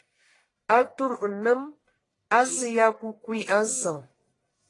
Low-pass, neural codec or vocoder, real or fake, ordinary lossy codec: 10.8 kHz; codec, 44.1 kHz, 1.7 kbps, Pupu-Codec; fake; AAC, 32 kbps